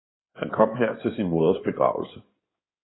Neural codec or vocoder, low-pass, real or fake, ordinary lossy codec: codec, 16 kHz in and 24 kHz out, 2.2 kbps, FireRedTTS-2 codec; 7.2 kHz; fake; AAC, 16 kbps